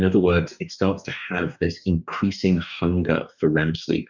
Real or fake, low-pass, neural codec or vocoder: fake; 7.2 kHz; codec, 44.1 kHz, 2.6 kbps, DAC